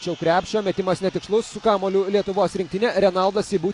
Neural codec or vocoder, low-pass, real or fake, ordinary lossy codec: none; 10.8 kHz; real; AAC, 48 kbps